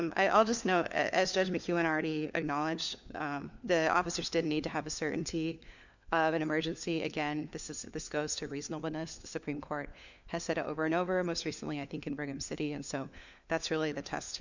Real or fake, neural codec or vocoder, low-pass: fake; codec, 16 kHz, 4 kbps, FunCodec, trained on LibriTTS, 50 frames a second; 7.2 kHz